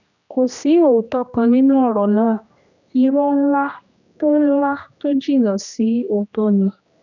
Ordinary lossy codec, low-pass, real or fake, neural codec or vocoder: none; 7.2 kHz; fake; codec, 16 kHz, 1 kbps, X-Codec, HuBERT features, trained on general audio